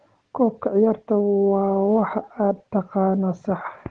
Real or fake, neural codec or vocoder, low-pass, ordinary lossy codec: real; none; 9.9 kHz; Opus, 16 kbps